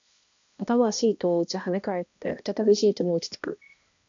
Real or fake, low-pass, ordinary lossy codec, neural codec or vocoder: fake; 7.2 kHz; AAC, 64 kbps; codec, 16 kHz, 1 kbps, X-Codec, HuBERT features, trained on balanced general audio